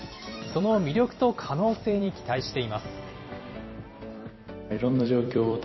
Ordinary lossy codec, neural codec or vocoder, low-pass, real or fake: MP3, 24 kbps; vocoder, 44.1 kHz, 128 mel bands every 256 samples, BigVGAN v2; 7.2 kHz; fake